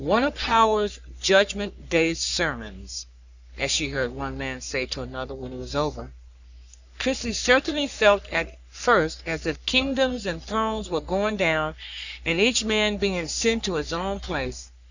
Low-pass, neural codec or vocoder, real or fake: 7.2 kHz; codec, 44.1 kHz, 3.4 kbps, Pupu-Codec; fake